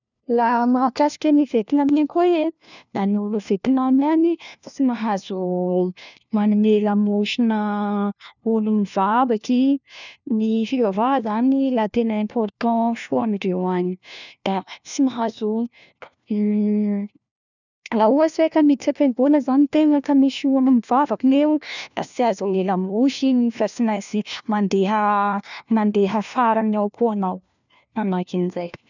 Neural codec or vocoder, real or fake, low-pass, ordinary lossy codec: codec, 16 kHz, 1 kbps, FunCodec, trained on LibriTTS, 50 frames a second; fake; 7.2 kHz; none